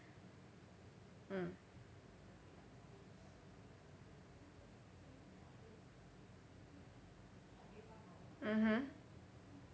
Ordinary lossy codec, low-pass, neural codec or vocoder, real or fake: none; none; none; real